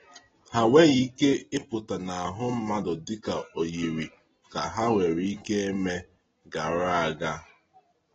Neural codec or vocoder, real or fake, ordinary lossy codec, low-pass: none; real; AAC, 24 kbps; 7.2 kHz